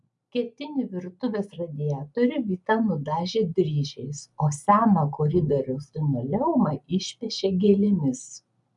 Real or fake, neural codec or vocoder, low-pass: real; none; 10.8 kHz